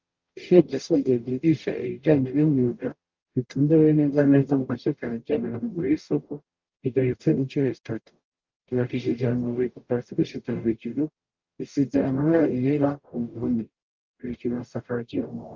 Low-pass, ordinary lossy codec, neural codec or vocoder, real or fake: 7.2 kHz; Opus, 24 kbps; codec, 44.1 kHz, 0.9 kbps, DAC; fake